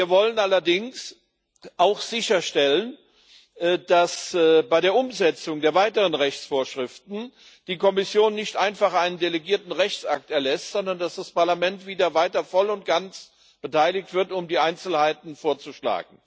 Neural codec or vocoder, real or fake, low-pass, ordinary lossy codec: none; real; none; none